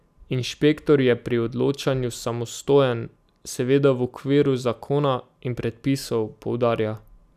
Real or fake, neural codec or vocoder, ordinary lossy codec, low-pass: real; none; none; 14.4 kHz